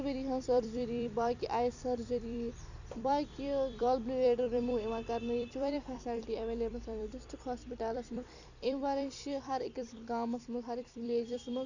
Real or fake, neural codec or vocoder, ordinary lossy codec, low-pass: fake; vocoder, 44.1 kHz, 128 mel bands every 256 samples, BigVGAN v2; none; 7.2 kHz